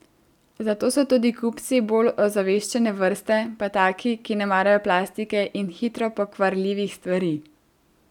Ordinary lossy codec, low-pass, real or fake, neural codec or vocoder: none; 19.8 kHz; real; none